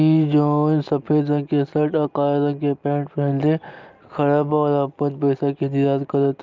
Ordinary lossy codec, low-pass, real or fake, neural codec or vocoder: Opus, 24 kbps; 7.2 kHz; real; none